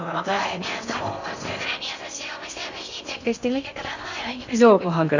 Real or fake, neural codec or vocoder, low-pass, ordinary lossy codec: fake; codec, 16 kHz in and 24 kHz out, 0.6 kbps, FocalCodec, streaming, 4096 codes; 7.2 kHz; none